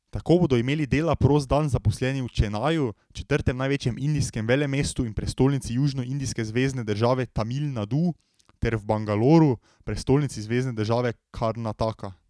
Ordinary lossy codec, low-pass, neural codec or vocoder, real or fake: none; none; none; real